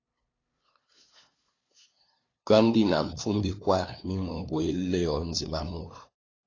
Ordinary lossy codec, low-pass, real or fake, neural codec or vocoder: AAC, 32 kbps; 7.2 kHz; fake; codec, 16 kHz, 2 kbps, FunCodec, trained on LibriTTS, 25 frames a second